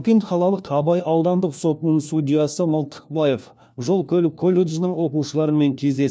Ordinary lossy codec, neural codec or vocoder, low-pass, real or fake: none; codec, 16 kHz, 1 kbps, FunCodec, trained on LibriTTS, 50 frames a second; none; fake